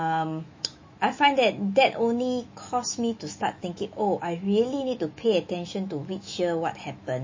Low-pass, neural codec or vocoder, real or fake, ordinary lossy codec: 7.2 kHz; autoencoder, 48 kHz, 128 numbers a frame, DAC-VAE, trained on Japanese speech; fake; MP3, 32 kbps